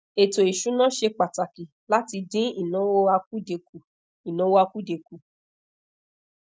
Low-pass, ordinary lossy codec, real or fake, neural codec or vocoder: none; none; real; none